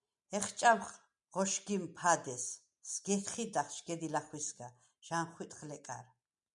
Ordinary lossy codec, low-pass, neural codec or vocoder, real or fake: MP3, 96 kbps; 10.8 kHz; none; real